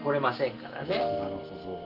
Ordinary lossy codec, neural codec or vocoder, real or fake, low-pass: Opus, 32 kbps; none; real; 5.4 kHz